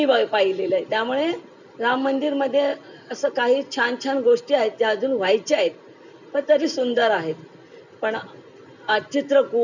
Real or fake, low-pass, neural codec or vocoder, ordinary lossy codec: real; 7.2 kHz; none; none